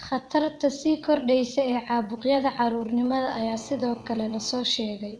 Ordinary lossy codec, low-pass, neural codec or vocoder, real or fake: none; none; vocoder, 22.05 kHz, 80 mel bands, WaveNeXt; fake